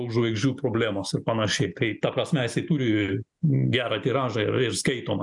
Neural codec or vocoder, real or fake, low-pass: none; real; 10.8 kHz